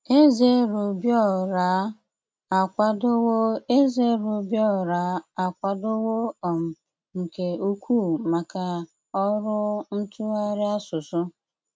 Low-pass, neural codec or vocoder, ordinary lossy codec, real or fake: none; none; none; real